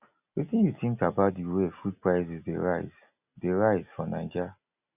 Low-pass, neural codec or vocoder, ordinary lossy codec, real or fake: 3.6 kHz; none; none; real